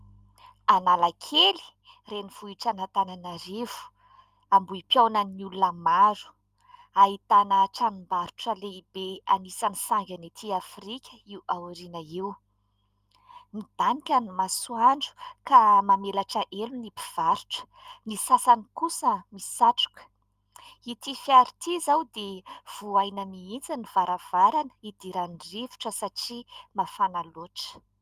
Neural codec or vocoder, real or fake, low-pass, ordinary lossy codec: none; real; 14.4 kHz; Opus, 32 kbps